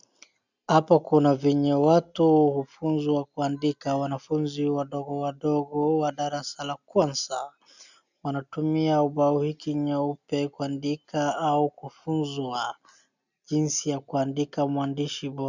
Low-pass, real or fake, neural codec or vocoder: 7.2 kHz; real; none